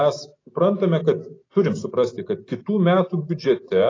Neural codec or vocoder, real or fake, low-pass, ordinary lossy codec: none; real; 7.2 kHz; AAC, 32 kbps